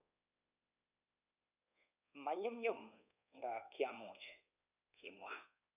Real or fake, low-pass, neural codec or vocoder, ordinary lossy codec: fake; 3.6 kHz; codec, 24 kHz, 1.2 kbps, DualCodec; none